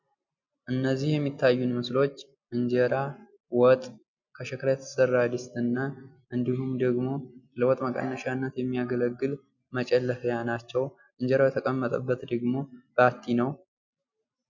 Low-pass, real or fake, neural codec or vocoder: 7.2 kHz; real; none